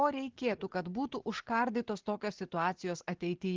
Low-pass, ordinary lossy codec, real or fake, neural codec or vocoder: 7.2 kHz; Opus, 16 kbps; real; none